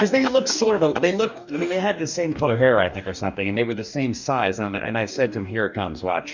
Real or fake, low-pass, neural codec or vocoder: fake; 7.2 kHz; codec, 44.1 kHz, 2.6 kbps, DAC